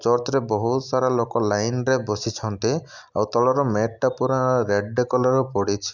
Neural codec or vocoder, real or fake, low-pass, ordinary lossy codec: none; real; 7.2 kHz; none